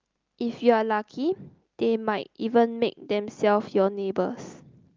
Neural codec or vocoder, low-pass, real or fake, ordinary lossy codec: none; 7.2 kHz; real; Opus, 24 kbps